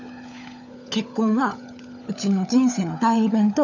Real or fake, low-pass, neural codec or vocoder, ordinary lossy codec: fake; 7.2 kHz; codec, 16 kHz, 16 kbps, FunCodec, trained on LibriTTS, 50 frames a second; none